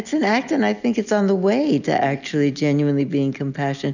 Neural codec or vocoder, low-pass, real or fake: none; 7.2 kHz; real